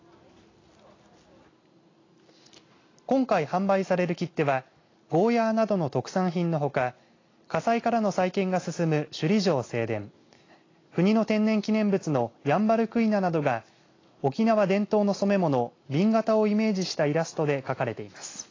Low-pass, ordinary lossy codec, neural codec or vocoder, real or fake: 7.2 kHz; AAC, 32 kbps; none; real